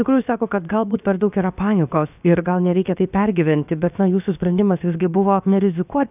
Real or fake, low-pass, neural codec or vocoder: fake; 3.6 kHz; codec, 16 kHz, about 1 kbps, DyCAST, with the encoder's durations